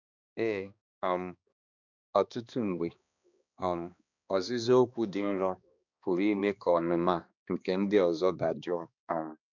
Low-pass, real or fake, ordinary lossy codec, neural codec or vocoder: 7.2 kHz; fake; none; codec, 16 kHz, 2 kbps, X-Codec, HuBERT features, trained on balanced general audio